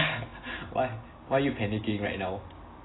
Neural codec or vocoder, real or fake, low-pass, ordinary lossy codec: none; real; 7.2 kHz; AAC, 16 kbps